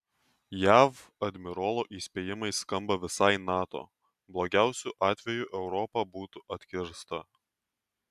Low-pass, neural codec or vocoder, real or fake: 14.4 kHz; none; real